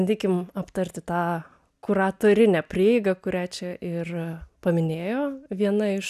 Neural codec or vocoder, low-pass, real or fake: none; 14.4 kHz; real